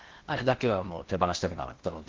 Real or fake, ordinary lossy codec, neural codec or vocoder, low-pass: fake; Opus, 16 kbps; codec, 16 kHz in and 24 kHz out, 0.8 kbps, FocalCodec, streaming, 65536 codes; 7.2 kHz